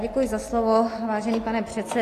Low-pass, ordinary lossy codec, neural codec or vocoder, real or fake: 14.4 kHz; AAC, 48 kbps; none; real